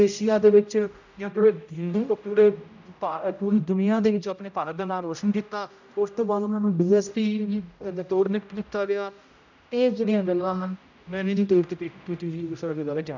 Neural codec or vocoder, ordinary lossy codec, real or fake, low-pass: codec, 16 kHz, 0.5 kbps, X-Codec, HuBERT features, trained on general audio; none; fake; 7.2 kHz